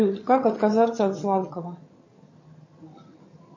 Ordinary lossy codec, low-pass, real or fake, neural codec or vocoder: MP3, 32 kbps; 7.2 kHz; fake; vocoder, 22.05 kHz, 80 mel bands, HiFi-GAN